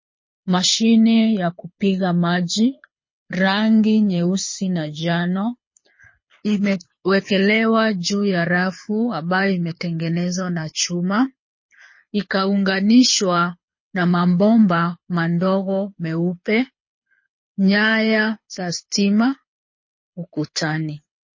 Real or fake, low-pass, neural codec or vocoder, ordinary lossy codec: fake; 7.2 kHz; codec, 24 kHz, 6 kbps, HILCodec; MP3, 32 kbps